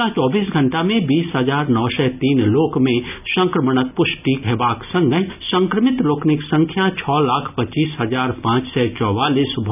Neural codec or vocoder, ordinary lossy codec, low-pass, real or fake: none; none; 3.6 kHz; real